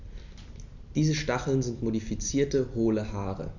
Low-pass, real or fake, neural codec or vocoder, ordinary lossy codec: 7.2 kHz; real; none; none